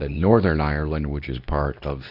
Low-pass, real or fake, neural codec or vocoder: 5.4 kHz; fake; codec, 24 kHz, 0.9 kbps, WavTokenizer, medium speech release version 2